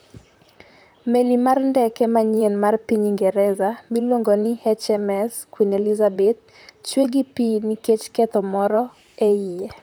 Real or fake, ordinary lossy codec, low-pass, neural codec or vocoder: fake; none; none; vocoder, 44.1 kHz, 128 mel bands, Pupu-Vocoder